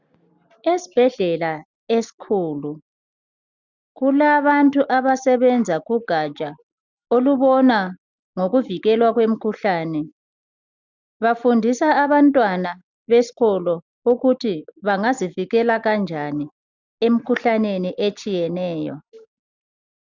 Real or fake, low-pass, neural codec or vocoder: real; 7.2 kHz; none